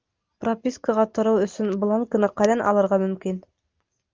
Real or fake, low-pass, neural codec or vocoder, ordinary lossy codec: real; 7.2 kHz; none; Opus, 32 kbps